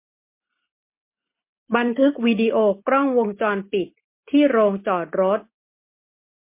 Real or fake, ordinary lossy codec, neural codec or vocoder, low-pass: real; MP3, 24 kbps; none; 3.6 kHz